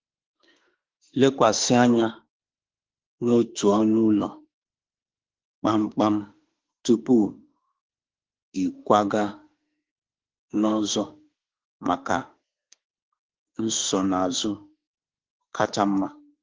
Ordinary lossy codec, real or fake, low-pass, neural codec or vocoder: Opus, 16 kbps; fake; 7.2 kHz; autoencoder, 48 kHz, 32 numbers a frame, DAC-VAE, trained on Japanese speech